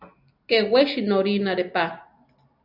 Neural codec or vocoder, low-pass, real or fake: none; 5.4 kHz; real